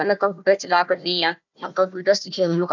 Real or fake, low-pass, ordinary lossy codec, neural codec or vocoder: fake; 7.2 kHz; none; codec, 16 kHz, 1 kbps, FunCodec, trained on Chinese and English, 50 frames a second